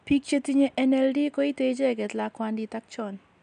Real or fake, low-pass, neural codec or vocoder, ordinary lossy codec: real; 9.9 kHz; none; none